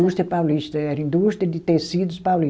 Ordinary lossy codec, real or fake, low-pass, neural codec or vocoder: none; real; none; none